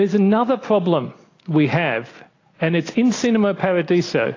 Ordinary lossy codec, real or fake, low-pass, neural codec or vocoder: AAC, 32 kbps; real; 7.2 kHz; none